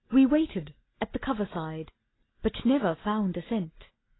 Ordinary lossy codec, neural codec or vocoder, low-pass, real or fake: AAC, 16 kbps; none; 7.2 kHz; real